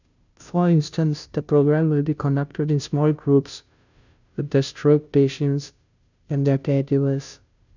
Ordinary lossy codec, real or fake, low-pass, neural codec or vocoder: none; fake; 7.2 kHz; codec, 16 kHz, 0.5 kbps, FunCodec, trained on Chinese and English, 25 frames a second